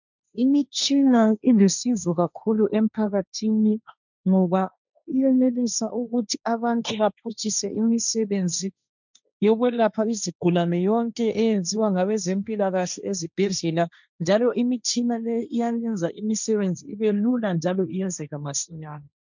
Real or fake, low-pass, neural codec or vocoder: fake; 7.2 kHz; codec, 16 kHz, 1.1 kbps, Voila-Tokenizer